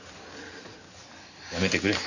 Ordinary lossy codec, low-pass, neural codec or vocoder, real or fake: none; 7.2 kHz; none; real